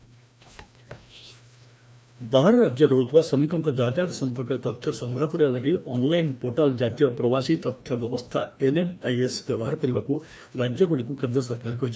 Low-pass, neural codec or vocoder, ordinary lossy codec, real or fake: none; codec, 16 kHz, 1 kbps, FreqCodec, larger model; none; fake